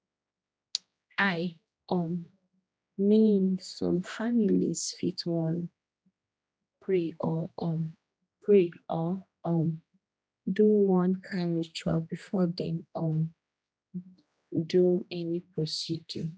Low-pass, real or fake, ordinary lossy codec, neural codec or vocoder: none; fake; none; codec, 16 kHz, 1 kbps, X-Codec, HuBERT features, trained on general audio